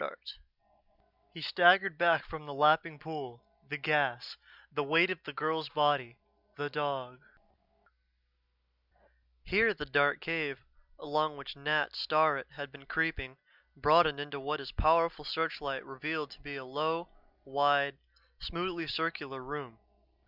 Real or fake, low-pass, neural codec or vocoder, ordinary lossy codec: real; 5.4 kHz; none; Opus, 64 kbps